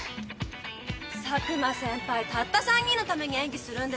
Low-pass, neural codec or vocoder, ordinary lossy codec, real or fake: none; none; none; real